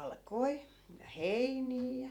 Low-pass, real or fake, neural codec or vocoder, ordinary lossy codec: 19.8 kHz; fake; vocoder, 48 kHz, 128 mel bands, Vocos; none